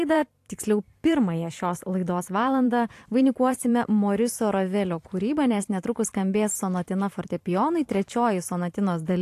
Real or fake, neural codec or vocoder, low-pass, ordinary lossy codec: fake; vocoder, 44.1 kHz, 128 mel bands every 512 samples, BigVGAN v2; 14.4 kHz; AAC, 64 kbps